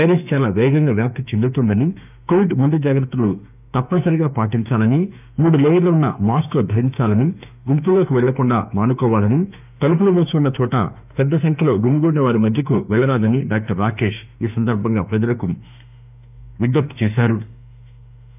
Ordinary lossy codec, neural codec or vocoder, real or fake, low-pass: none; autoencoder, 48 kHz, 32 numbers a frame, DAC-VAE, trained on Japanese speech; fake; 3.6 kHz